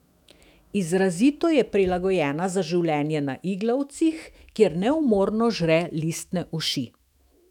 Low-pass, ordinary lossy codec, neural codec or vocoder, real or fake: 19.8 kHz; none; autoencoder, 48 kHz, 128 numbers a frame, DAC-VAE, trained on Japanese speech; fake